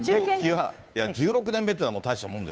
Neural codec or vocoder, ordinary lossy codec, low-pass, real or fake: codec, 16 kHz, 2 kbps, FunCodec, trained on Chinese and English, 25 frames a second; none; none; fake